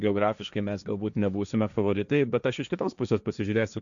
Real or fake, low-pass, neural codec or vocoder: fake; 7.2 kHz; codec, 16 kHz, 1.1 kbps, Voila-Tokenizer